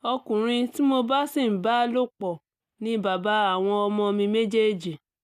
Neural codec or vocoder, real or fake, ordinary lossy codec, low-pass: none; real; none; 14.4 kHz